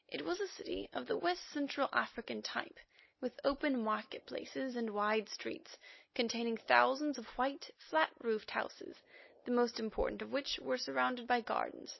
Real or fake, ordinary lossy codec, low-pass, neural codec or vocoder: real; MP3, 24 kbps; 7.2 kHz; none